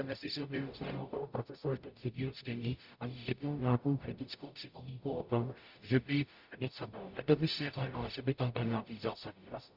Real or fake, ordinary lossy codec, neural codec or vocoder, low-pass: fake; Opus, 64 kbps; codec, 44.1 kHz, 0.9 kbps, DAC; 5.4 kHz